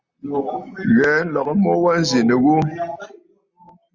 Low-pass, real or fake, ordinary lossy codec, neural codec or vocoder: 7.2 kHz; real; Opus, 64 kbps; none